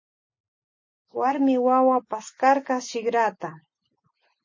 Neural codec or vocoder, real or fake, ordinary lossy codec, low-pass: none; real; MP3, 32 kbps; 7.2 kHz